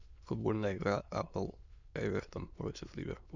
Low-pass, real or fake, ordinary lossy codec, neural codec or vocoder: 7.2 kHz; fake; Opus, 64 kbps; autoencoder, 22.05 kHz, a latent of 192 numbers a frame, VITS, trained on many speakers